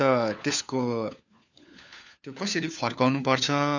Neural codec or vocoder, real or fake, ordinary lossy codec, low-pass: codec, 16 kHz, 4 kbps, FunCodec, trained on LibriTTS, 50 frames a second; fake; none; 7.2 kHz